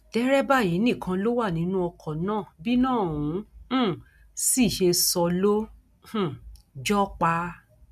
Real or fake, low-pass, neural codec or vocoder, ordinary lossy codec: real; 14.4 kHz; none; none